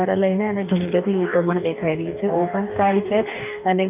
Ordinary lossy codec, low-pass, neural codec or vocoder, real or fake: none; 3.6 kHz; codec, 44.1 kHz, 2.6 kbps, DAC; fake